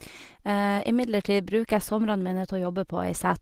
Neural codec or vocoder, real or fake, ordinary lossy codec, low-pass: none; real; Opus, 16 kbps; 14.4 kHz